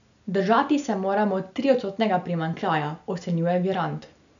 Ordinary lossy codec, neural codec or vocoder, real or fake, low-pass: none; none; real; 7.2 kHz